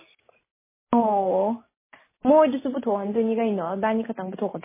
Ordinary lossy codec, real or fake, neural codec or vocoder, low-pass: MP3, 16 kbps; real; none; 3.6 kHz